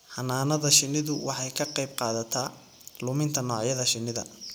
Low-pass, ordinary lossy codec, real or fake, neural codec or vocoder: none; none; real; none